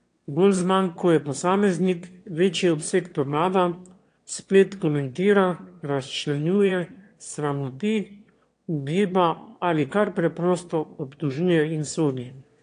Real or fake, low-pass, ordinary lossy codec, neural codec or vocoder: fake; 9.9 kHz; AAC, 48 kbps; autoencoder, 22.05 kHz, a latent of 192 numbers a frame, VITS, trained on one speaker